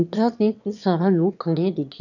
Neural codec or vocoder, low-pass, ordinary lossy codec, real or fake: autoencoder, 22.05 kHz, a latent of 192 numbers a frame, VITS, trained on one speaker; 7.2 kHz; none; fake